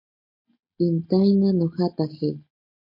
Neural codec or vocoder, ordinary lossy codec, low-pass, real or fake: none; MP3, 32 kbps; 5.4 kHz; real